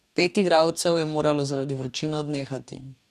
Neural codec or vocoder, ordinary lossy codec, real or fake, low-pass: codec, 44.1 kHz, 2.6 kbps, DAC; none; fake; 14.4 kHz